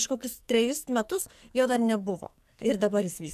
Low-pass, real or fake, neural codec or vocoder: 14.4 kHz; fake; codec, 44.1 kHz, 2.6 kbps, SNAC